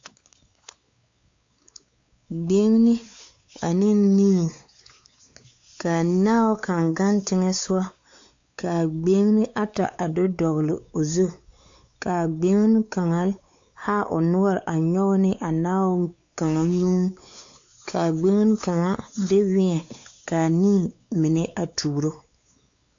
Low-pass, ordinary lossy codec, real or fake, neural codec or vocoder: 7.2 kHz; AAC, 64 kbps; fake; codec, 16 kHz, 4 kbps, X-Codec, WavLM features, trained on Multilingual LibriSpeech